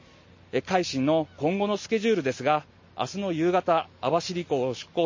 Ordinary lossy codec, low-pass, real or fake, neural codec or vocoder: MP3, 32 kbps; 7.2 kHz; real; none